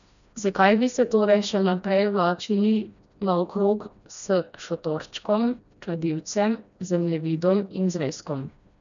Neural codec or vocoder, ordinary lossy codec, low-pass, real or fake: codec, 16 kHz, 1 kbps, FreqCodec, smaller model; none; 7.2 kHz; fake